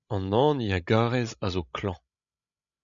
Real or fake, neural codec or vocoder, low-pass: fake; codec, 16 kHz, 16 kbps, FreqCodec, larger model; 7.2 kHz